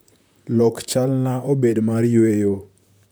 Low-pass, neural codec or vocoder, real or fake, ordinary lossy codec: none; vocoder, 44.1 kHz, 128 mel bands, Pupu-Vocoder; fake; none